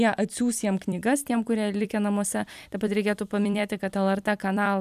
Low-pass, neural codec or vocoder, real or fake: 14.4 kHz; vocoder, 44.1 kHz, 128 mel bands every 256 samples, BigVGAN v2; fake